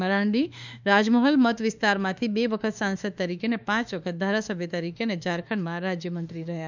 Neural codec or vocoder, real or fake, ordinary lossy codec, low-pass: autoencoder, 48 kHz, 32 numbers a frame, DAC-VAE, trained on Japanese speech; fake; none; 7.2 kHz